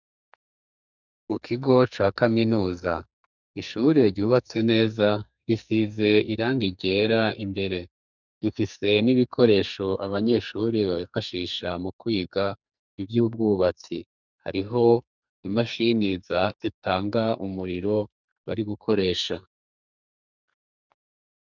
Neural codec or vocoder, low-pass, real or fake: codec, 44.1 kHz, 2.6 kbps, SNAC; 7.2 kHz; fake